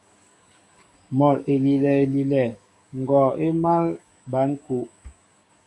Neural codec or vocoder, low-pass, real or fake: codec, 44.1 kHz, 7.8 kbps, DAC; 10.8 kHz; fake